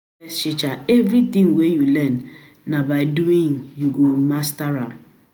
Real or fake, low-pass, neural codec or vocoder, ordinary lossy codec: real; none; none; none